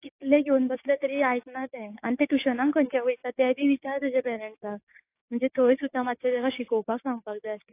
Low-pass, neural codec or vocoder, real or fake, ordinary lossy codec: 3.6 kHz; codec, 16 kHz, 6 kbps, DAC; fake; AAC, 24 kbps